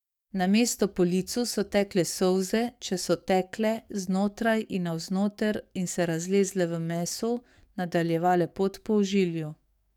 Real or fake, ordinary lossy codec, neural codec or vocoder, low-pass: fake; none; codec, 44.1 kHz, 7.8 kbps, DAC; 19.8 kHz